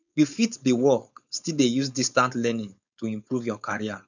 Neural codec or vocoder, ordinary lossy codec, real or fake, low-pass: codec, 16 kHz, 4.8 kbps, FACodec; none; fake; 7.2 kHz